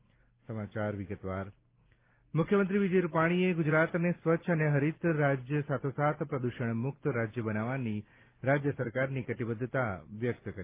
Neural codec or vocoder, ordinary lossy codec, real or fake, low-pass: none; Opus, 32 kbps; real; 3.6 kHz